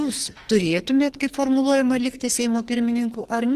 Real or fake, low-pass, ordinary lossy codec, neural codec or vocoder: fake; 14.4 kHz; Opus, 24 kbps; codec, 44.1 kHz, 2.6 kbps, SNAC